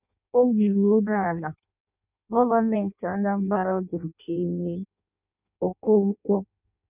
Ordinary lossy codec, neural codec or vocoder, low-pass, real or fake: none; codec, 16 kHz in and 24 kHz out, 0.6 kbps, FireRedTTS-2 codec; 3.6 kHz; fake